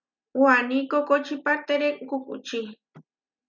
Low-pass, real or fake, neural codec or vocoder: 7.2 kHz; real; none